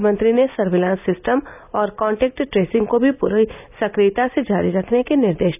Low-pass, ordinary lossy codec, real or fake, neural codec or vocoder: 3.6 kHz; none; real; none